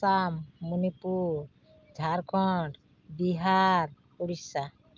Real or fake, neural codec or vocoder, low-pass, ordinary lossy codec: real; none; 7.2 kHz; Opus, 24 kbps